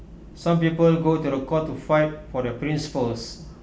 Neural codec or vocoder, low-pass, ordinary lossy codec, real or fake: none; none; none; real